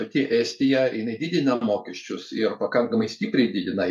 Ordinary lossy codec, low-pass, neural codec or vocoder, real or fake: MP3, 96 kbps; 14.4 kHz; vocoder, 44.1 kHz, 128 mel bands every 512 samples, BigVGAN v2; fake